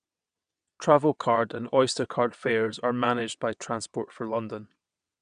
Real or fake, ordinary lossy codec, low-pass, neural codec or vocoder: fake; none; 9.9 kHz; vocoder, 22.05 kHz, 80 mel bands, WaveNeXt